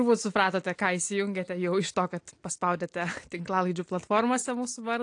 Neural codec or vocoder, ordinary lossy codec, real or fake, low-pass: none; AAC, 48 kbps; real; 9.9 kHz